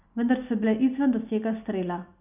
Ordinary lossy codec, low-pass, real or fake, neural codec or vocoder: none; 3.6 kHz; real; none